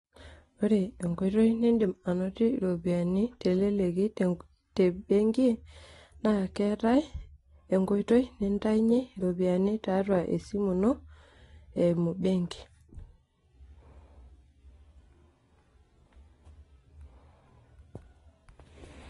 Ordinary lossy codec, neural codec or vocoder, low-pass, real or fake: AAC, 32 kbps; none; 10.8 kHz; real